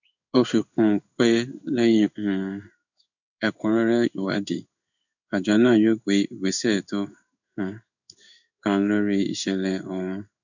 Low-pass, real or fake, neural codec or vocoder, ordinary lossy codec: 7.2 kHz; fake; codec, 16 kHz in and 24 kHz out, 1 kbps, XY-Tokenizer; none